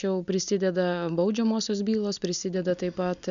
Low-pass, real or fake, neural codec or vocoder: 7.2 kHz; real; none